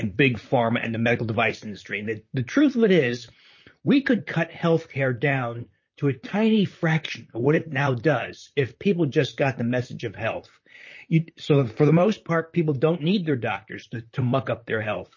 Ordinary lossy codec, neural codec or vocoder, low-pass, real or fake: MP3, 32 kbps; vocoder, 44.1 kHz, 128 mel bands, Pupu-Vocoder; 7.2 kHz; fake